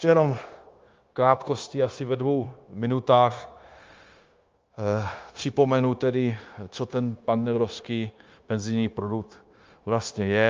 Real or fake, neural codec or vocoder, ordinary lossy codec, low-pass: fake; codec, 16 kHz, 0.9 kbps, LongCat-Audio-Codec; Opus, 24 kbps; 7.2 kHz